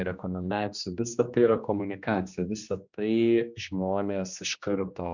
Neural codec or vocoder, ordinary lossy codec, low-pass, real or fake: codec, 16 kHz, 2 kbps, X-Codec, HuBERT features, trained on general audio; Opus, 64 kbps; 7.2 kHz; fake